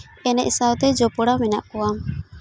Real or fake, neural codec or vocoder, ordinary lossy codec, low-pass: real; none; none; none